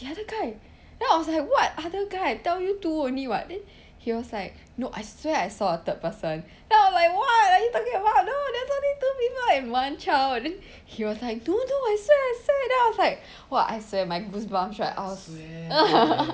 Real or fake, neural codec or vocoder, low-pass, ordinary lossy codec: real; none; none; none